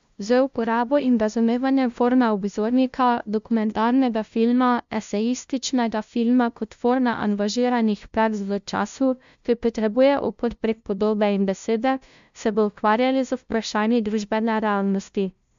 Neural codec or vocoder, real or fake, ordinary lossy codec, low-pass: codec, 16 kHz, 0.5 kbps, FunCodec, trained on LibriTTS, 25 frames a second; fake; MP3, 64 kbps; 7.2 kHz